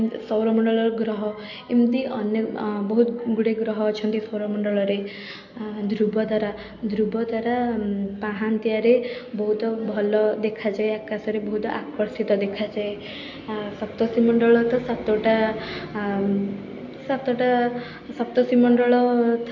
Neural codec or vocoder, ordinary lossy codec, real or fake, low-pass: none; MP3, 48 kbps; real; 7.2 kHz